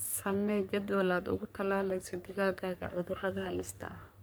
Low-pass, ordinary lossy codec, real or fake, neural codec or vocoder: none; none; fake; codec, 44.1 kHz, 3.4 kbps, Pupu-Codec